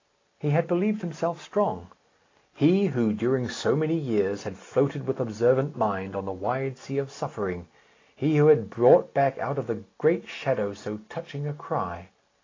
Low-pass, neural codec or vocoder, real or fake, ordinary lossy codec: 7.2 kHz; none; real; AAC, 32 kbps